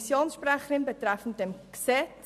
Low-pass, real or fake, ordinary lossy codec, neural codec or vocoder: 14.4 kHz; real; none; none